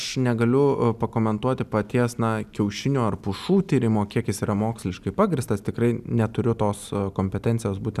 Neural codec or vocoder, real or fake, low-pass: none; real; 14.4 kHz